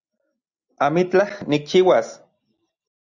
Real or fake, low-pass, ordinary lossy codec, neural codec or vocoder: real; 7.2 kHz; Opus, 64 kbps; none